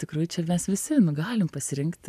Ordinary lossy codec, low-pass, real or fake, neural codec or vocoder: AAC, 96 kbps; 14.4 kHz; real; none